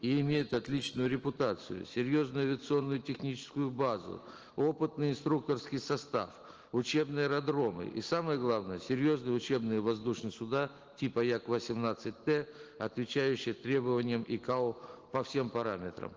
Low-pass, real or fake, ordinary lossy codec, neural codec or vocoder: 7.2 kHz; real; Opus, 16 kbps; none